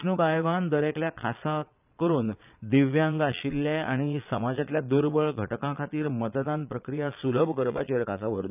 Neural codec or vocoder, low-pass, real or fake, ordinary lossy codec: vocoder, 22.05 kHz, 80 mel bands, Vocos; 3.6 kHz; fake; none